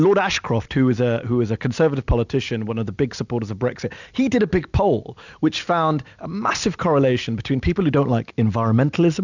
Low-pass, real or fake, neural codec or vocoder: 7.2 kHz; real; none